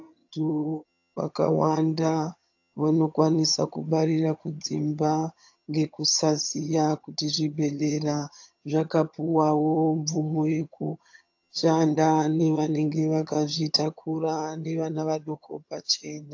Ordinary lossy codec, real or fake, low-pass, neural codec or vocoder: AAC, 48 kbps; fake; 7.2 kHz; vocoder, 22.05 kHz, 80 mel bands, HiFi-GAN